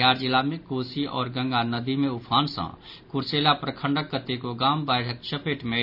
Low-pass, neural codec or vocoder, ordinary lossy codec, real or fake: 5.4 kHz; none; none; real